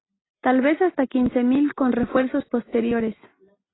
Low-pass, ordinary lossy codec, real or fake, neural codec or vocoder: 7.2 kHz; AAC, 16 kbps; real; none